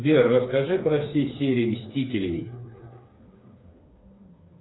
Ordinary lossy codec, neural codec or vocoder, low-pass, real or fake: AAC, 16 kbps; codec, 16 kHz, 4 kbps, FreqCodec, smaller model; 7.2 kHz; fake